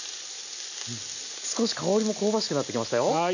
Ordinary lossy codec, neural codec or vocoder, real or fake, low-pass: none; none; real; 7.2 kHz